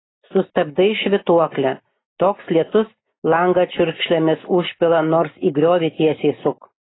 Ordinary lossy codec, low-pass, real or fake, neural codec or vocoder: AAC, 16 kbps; 7.2 kHz; real; none